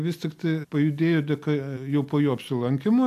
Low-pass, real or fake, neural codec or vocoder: 14.4 kHz; real; none